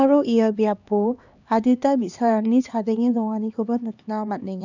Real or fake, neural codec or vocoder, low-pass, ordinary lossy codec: fake; codec, 16 kHz, 2 kbps, X-Codec, HuBERT features, trained on LibriSpeech; 7.2 kHz; none